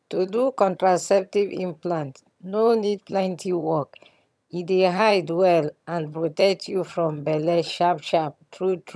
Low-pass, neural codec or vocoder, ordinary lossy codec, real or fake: none; vocoder, 22.05 kHz, 80 mel bands, HiFi-GAN; none; fake